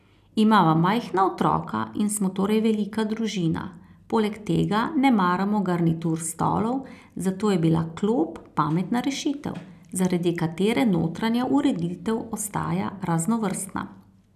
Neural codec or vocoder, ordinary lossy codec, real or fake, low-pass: none; none; real; 14.4 kHz